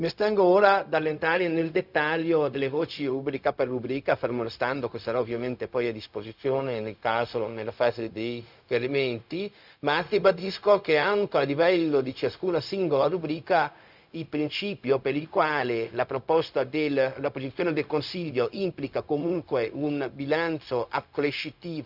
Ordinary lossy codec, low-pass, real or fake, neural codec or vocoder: none; 5.4 kHz; fake; codec, 16 kHz, 0.4 kbps, LongCat-Audio-Codec